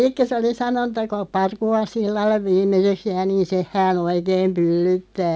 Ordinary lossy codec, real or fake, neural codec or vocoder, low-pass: none; real; none; none